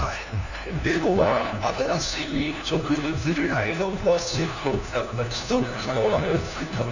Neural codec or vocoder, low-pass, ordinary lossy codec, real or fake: codec, 16 kHz, 1 kbps, FunCodec, trained on LibriTTS, 50 frames a second; 7.2 kHz; none; fake